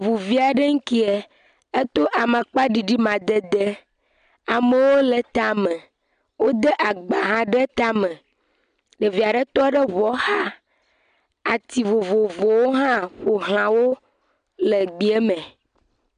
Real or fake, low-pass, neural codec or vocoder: real; 9.9 kHz; none